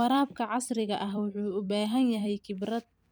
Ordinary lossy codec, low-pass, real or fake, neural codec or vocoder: none; none; fake; vocoder, 44.1 kHz, 128 mel bands every 256 samples, BigVGAN v2